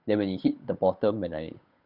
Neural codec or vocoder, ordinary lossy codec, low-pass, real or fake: codec, 16 kHz, 8 kbps, FunCodec, trained on Chinese and English, 25 frames a second; none; 5.4 kHz; fake